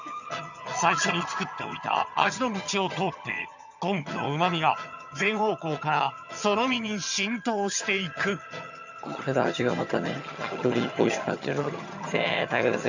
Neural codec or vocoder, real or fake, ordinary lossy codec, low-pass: vocoder, 22.05 kHz, 80 mel bands, HiFi-GAN; fake; none; 7.2 kHz